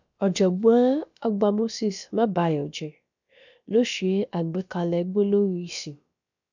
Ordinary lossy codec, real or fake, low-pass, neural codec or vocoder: none; fake; 7.2 kHz; codec, 16 kHz, about 1 kbps, DyCAST, with the encoder's durations